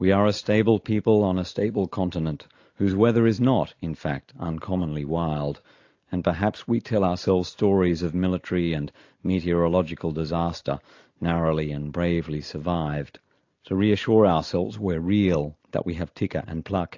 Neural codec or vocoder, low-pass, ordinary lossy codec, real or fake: none; 7.2 kHz; AAC, 48 kbps; real